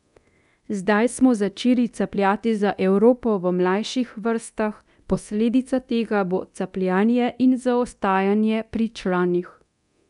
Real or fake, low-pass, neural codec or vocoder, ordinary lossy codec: fake; 10.8 kHz; codec, 24 kHz, 0.9 kbps, DualCodec; none